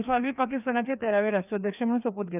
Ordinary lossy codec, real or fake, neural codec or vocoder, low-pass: none; fake; codec, 16 kHz, 2 kbps, FreqCodec, larger model; 3.6 kHz